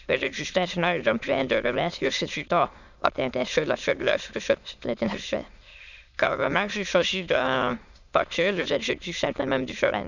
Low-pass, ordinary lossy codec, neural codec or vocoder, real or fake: 7.2 kHz; none; autoencoder, 22.05 kHz, a latent of 192 numbers a frame, VITS, trained on many speakers; fake